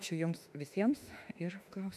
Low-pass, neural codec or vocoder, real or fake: 14.4 kHz; autoencoder, 48 kHz, 32 numbers a frame, DAC-VAE, trained on Japanese speech; fake